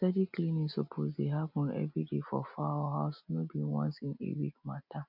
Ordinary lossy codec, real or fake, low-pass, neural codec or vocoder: none; real; 5.4 kHz; none